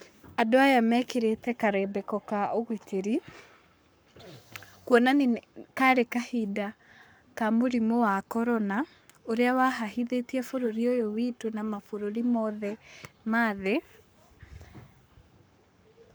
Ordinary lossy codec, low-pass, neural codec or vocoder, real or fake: none; none; codec, 44.1 kHz, 7.8 kbps, Pupu-Codec; fake